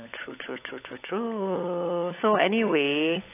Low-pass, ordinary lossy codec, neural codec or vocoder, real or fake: 3.6 kHz; AAC, 24 kbps; codec, 16 kHz, 16 kbps, FunCodec, trained on LibriTTS, 50 frames a second; fake